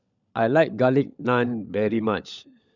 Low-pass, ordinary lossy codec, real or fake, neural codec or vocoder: 7.2 kHz; none; fake; codec, 16 kHz, 16 kbps, FunCodec, trained on LibriTTS, 50 frames a second